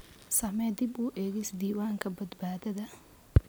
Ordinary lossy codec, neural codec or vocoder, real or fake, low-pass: none; none; real; none